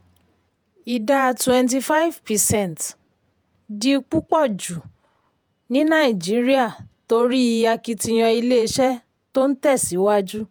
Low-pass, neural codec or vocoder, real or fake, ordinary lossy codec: none; vocoder, 48 kHz, 128 mel bands, Vocos; fake; none